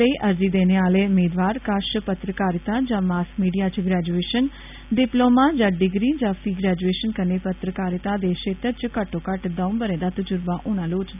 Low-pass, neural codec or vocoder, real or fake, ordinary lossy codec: 3.6 kHz; none; real; none